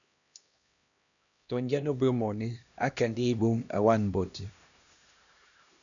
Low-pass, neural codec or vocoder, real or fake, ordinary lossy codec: 7.2 kHz; codec, 16 kHz, 1 kbps, X-Codec, HuBERT features, trained on LibriSpeech; fake; MP3, 96 kbps